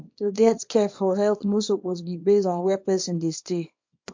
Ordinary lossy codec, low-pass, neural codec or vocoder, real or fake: MP3, 48 kbps; 7.2 kHz; codec, 24 kHz, 0.9 kbps, WavTokenizer, small release; fake